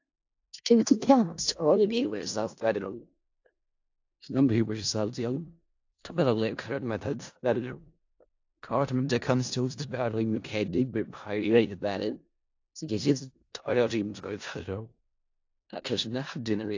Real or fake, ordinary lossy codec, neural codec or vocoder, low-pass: fake; AAC, 48 kbps; codec, 16 kHz in and 24 kHz out, 0.4 kbps, LongCat-Audio-Codec, four codebook decoder; 7.2 kHz